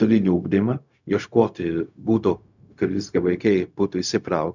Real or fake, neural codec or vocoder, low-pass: fake; codec, 16 kHz, 0.4 kbps, LongCat-Audio-Codec; 7.2 kHz